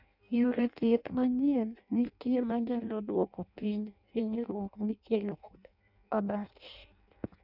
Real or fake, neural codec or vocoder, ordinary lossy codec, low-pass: fake; codec, 16 kHz in and 24 kHz out, 0.6 kbps, FireRedTTS-2 codec; none; 5.4 kHz